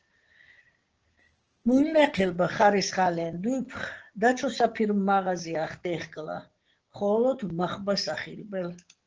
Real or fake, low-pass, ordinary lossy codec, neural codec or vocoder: fake; 7.2 kHz; Opus, 16 kbps; codec, 16 kHz, 6 kbps, DAC